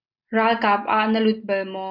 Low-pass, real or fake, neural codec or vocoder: 5.4 kHz; real; none